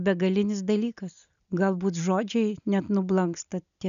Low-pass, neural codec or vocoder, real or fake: 7.2 kHz; none; real